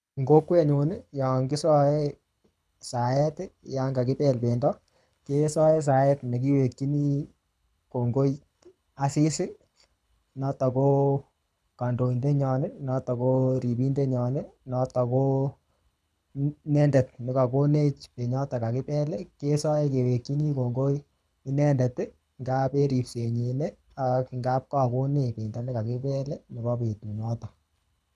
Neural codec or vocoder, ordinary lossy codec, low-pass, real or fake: codec, 24 kHz, 6 kbps, HILCodec; none; none; fake